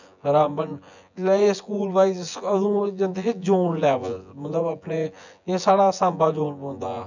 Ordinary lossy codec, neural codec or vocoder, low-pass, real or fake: none; vocoder, 24 kHz, 100 mel bands, Vocos; 7.2 kHz; fake